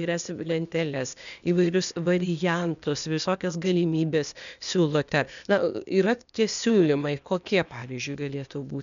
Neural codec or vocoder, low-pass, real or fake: codec, 16 kHz, 0.8 kbps, ZipCodec; 7.2 kHz; fake